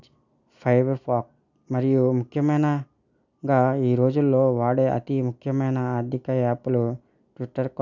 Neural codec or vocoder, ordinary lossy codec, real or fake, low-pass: none; none; real; 7.2 kHz